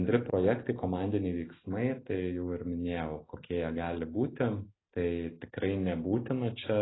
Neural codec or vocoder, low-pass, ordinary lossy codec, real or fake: none; 7.2 kHz; AAC, 16 kbps; real